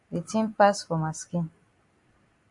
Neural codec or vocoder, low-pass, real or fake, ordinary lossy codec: none; 10.8 kHz; real; AAC, 64 kbps